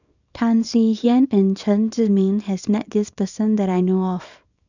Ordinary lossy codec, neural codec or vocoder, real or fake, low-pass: none; codec, 24 kHz, 0.9 kbps, WavTokenizer, small release; fake; 7.2 kHz